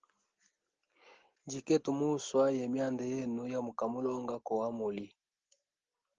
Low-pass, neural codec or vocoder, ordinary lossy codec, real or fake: 7.2 kHz; none; Opus, 16 kbps; real